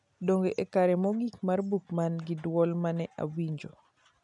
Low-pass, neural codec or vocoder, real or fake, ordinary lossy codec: 10.8 kHz; none; real; none